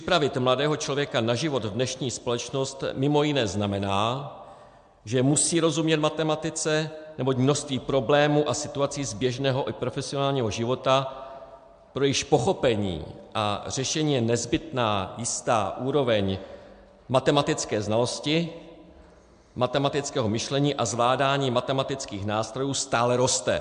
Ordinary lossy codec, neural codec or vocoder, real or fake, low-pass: MP3, 64 kbps; none; real; 9.9 kHz